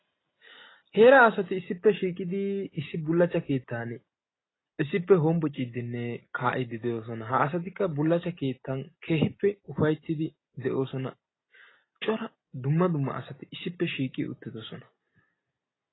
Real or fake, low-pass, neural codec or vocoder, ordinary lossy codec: real; 7.2 kHz; none; AAC, 16 kbps